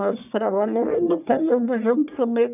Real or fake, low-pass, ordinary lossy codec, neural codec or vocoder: fake; 3.6 kHz; none; codec, 44.1 kHz, 1.7 kbps, Pupu-Codec